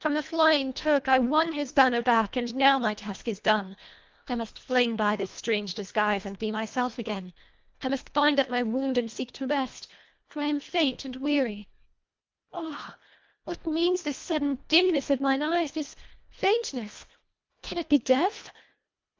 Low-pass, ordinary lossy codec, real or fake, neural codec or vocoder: 7.2 kHz; Opus, 24 kbps; fake; codec, 24 kHz, 1.5 kbps, HILCodec